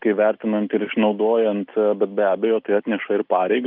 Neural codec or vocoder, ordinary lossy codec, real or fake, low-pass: none; Opus, 24 kbps; real; 5.4 kHz